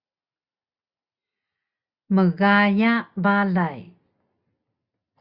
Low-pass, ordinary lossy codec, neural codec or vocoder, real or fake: 5.4 kHz; Opus, 64 kbps; none; real